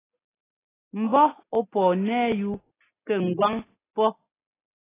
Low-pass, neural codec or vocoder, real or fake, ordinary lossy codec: 3.6 kHz; none; real; AAC, 16 kbps